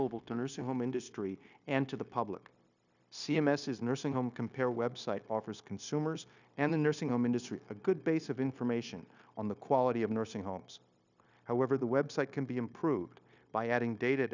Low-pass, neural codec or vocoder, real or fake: 7.2 kHz; codec, 16 kHz, 0.9 kbps, LongCat-Audio-Codec; fake